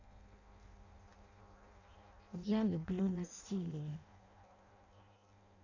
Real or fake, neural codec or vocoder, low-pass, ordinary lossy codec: fake; codec, 16 kHz in and 24 kHz out, 0.6 kbps, FireRedTTS-2 codec; 7.2 kHz; none